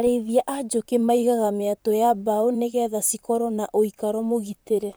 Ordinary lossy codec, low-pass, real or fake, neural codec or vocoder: none; none; fake; vocoder, 44.1 kHz, 128 mel bands, Pupu-Vocoder